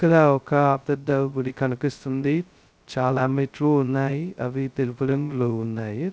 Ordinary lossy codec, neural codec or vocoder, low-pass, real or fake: none; codec, 16 kHz, 0.2 kbps, FocalCodec; none; fake